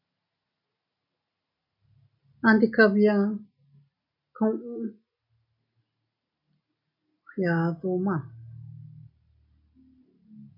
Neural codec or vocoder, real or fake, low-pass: none; real; 5.4 kHz